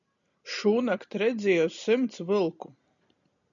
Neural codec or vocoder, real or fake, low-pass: none; real; 7.2 kHz